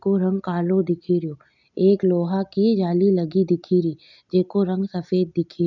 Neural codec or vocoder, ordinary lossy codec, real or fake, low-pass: none; none; real; 7.2 kHz